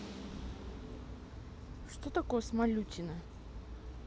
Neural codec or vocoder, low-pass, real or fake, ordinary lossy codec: none; none; real; none